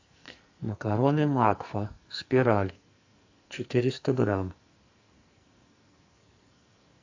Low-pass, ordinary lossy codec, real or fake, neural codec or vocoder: 7.2 kHz; AAC, 48 kbps; fake; codec, 44.1 kHz, 2.6 kbps, SNAC